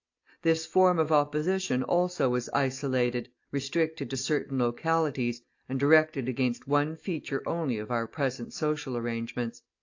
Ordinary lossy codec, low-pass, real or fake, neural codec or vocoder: AAC, 48 kbps; 7.2 kHz; real; none